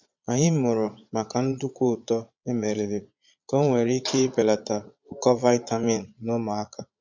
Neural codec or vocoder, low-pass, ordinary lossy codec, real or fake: vocoder, 22.05 kHz, 80 mel bands, Vocos; 7.2 kHz; MP3, 64 kbps; fake